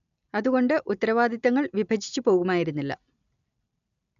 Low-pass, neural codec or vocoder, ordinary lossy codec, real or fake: 7.2 kHz; none; none; real